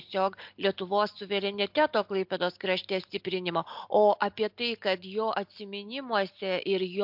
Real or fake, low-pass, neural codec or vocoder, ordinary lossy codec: real; 5.4 kHz; none; MP3, 48 kbps